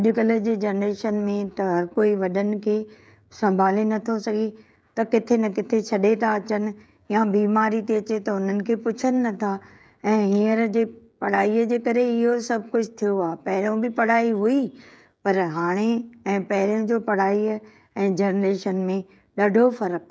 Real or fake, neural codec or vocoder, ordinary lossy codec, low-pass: fake; codec, 16 kHz, 16 kbps, FreqCodec, smaller model; none; none